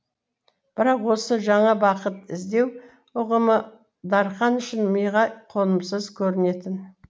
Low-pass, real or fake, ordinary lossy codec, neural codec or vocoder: none; real; none; none